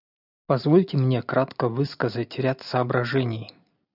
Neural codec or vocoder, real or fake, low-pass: none; real; 5.4 kHz